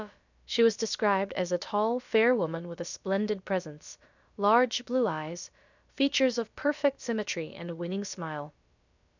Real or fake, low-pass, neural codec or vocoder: fake; 7.2 kHz; codec, 16 kHz, about 1 kbps, DyCAST, with the encoder's durations